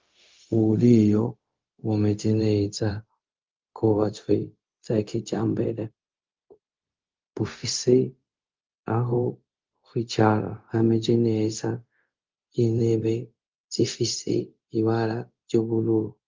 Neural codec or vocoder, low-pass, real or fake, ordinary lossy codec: codec, 16 kHz, 0.4 kbps, LongCat-Audio-Codec; 7.2 kHz; fake; Opus, 32 kbps